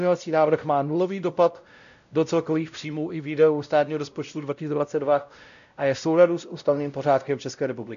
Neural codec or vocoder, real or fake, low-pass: codec, 16 kHz, 0.5 kbps, X-Codec, WavLM features, trained on Multilingual LibriSpeech; fake; 7.2 kHz